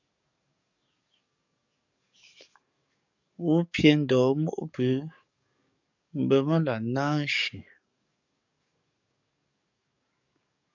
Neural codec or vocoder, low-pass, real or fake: codec, 44.1 kHz, 7.8 kbps, DAC; 7.2 kHz; fake